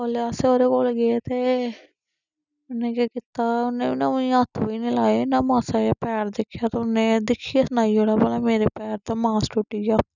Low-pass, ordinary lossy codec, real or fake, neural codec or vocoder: 7.2 kHz; none; real; none